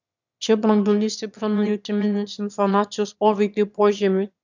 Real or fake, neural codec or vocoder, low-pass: fake; autoencoder, 22.05 kHz, a latent of 192 numbers a frame, VITS, trained on one speaker; 7.2 kHz